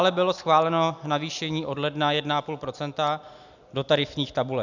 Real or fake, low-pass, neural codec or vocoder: real; 7.2 kHz; none